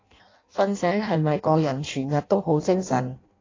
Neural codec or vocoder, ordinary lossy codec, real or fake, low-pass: codec, 16 kHz in and 24 kHz out, 0.6 kbps, FireRedTTS-2 codec; AAC, 32 kbps; fake; 7.2 kHz